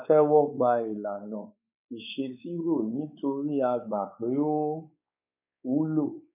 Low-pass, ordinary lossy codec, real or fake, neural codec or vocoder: 3.6 kHz; none; fake; codec, 16 kHz, 4 kbps, X-Codec, WavLM features, trained on Multilingual LibriSpeech